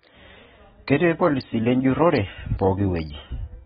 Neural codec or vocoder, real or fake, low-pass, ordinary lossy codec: none; real; 7.2 kHz; AAC, 16 kbps